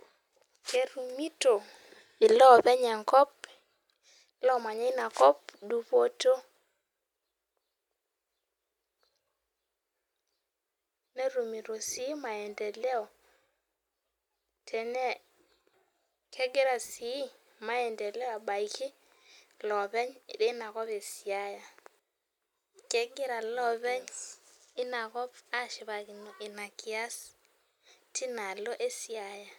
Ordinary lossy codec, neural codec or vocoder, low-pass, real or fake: none; none; 19.8 kHz; real